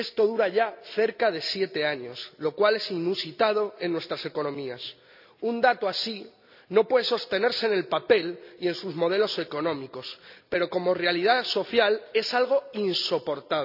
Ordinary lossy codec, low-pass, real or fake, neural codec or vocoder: none; 5.4 kHz; real; none